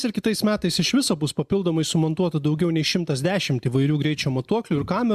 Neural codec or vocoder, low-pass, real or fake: none; 14.4 kHz; real